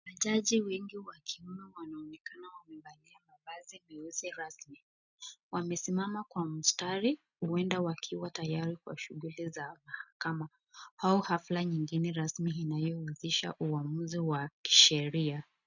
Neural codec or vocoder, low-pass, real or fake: none; 7.2 kHz; real